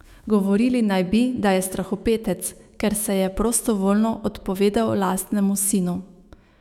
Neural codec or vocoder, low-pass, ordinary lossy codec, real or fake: autoencoder, 48 kHz, 128 numbers a frame, DAC-VAE, trained on Japanese speech; 19.8 kHz; none; fake